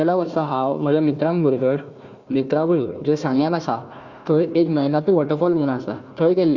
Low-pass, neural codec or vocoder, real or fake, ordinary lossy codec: 7.2 kHz; codec, 16 kHz, 1 kbps, FunCodec, trained on Chinese and English, 50 frames a second; fake; Opus, 64 kbps